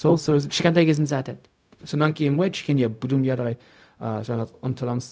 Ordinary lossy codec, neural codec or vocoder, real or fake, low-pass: none; codec, 16 kHz, 0.4 kbps, LongCat-Audio-Codec; fake; none